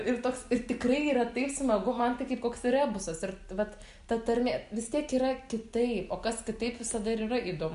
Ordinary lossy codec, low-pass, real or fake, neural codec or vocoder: MP3, 64 kbps; 10.8 kHz; real; none